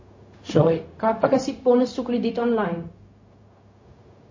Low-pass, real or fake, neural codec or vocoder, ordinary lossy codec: 7.2 kHz; fake; codec, 16 kHz, 0.4 kbps, LongCat-Audio-Codec; MP3, 32 kbps